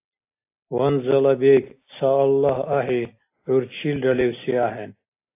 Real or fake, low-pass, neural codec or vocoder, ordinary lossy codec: real; 3.6 kHz; none; AAC, 24 kbps